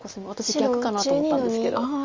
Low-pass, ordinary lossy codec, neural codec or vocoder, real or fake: 7.2 kHz; Opus, 32 kbps; none; real